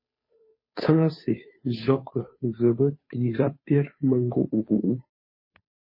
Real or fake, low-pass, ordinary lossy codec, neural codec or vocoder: fake; 5.4 kHz; MP3, 24 kbps; codec, 16 kHz, 2 kbps, FunCodec, trained on Chinese and English, 25 frames a second